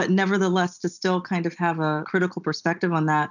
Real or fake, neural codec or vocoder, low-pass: real; none; 7.2 kHz